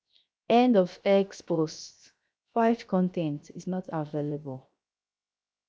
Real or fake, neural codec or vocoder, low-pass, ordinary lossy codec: fake; codec, 16 kHz, 0.7 kbps, FocalCodec; none; none